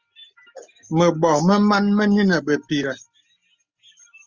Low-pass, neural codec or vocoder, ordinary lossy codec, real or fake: 7.2 kHz; none; Opus, 32 kbps; real